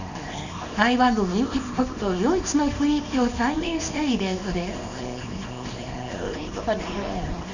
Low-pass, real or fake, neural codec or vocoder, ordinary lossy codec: 7.2 kHz; fake; codec, 24 kHz, 0.9 kbps, WavTokenizer, small release; none